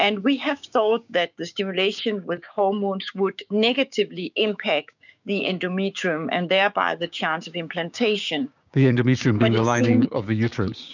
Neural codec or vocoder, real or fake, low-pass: codec, 16 kHz, 6 kbps, DAC; fake; 7.2 kHz